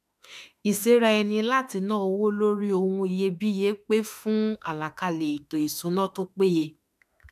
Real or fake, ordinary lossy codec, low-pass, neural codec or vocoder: fake; none; 14.4 kHz; autoencoder, 48 kHz, 32 numbers a frame, DAC-VAE, trained on Japanese speech